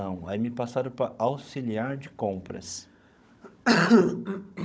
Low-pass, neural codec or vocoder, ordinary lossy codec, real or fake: none; codec, 16 kHz, 16 kbps, FunCodec, trained on Chinese and English, 50 frames a second; none; fake